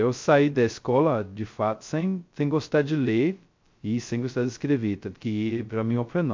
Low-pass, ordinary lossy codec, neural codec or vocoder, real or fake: 7.2 kHz; MP3, 48 kbps; codec, 16 kHz, 0.2 kbps, FocalCodec; fake